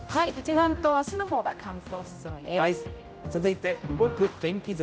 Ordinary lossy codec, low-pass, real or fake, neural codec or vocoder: none; none; fake; codec, 16 kHz, 0.5 kbps, X-Codec, HuBERT features, trained on general audio